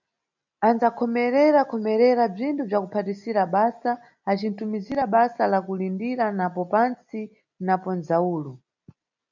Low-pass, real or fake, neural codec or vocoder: 7.2 kHz; real; none